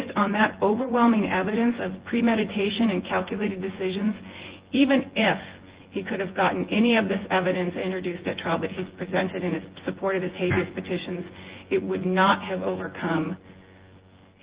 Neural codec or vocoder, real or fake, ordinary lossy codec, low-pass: vocoder, 24 kHz, 100 mel bands, Vocos; fake; Opus, 16 kbps; 3.6 kHz